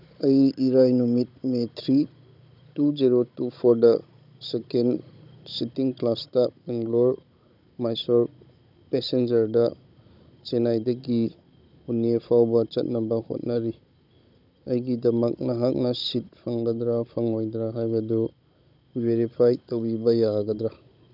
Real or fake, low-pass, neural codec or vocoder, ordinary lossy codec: fake; 5.4 kHz; codec, 16 kHz, 16 kbps, FunCodec, trained on Chinese and English, 50 frames a second; none